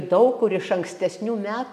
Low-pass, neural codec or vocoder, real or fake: 14.4 kHz; none; real